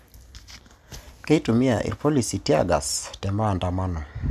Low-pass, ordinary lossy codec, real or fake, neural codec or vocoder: 14.4 kHz; none; real; none